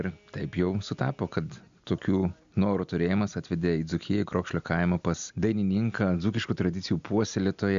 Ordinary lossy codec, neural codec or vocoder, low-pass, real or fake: MP3, 64 kbps; none; 7.2 kHz; real